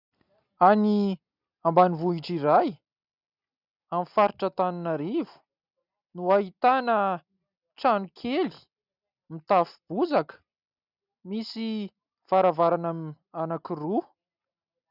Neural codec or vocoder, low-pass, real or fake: none; 5.4 kHz; real